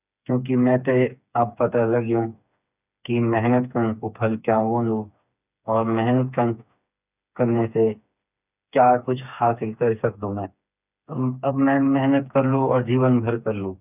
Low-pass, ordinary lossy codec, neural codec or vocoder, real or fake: 3.6 kHz; none; codec, 16 kHz, 4 kbps, FreqCodec, smaller model; fake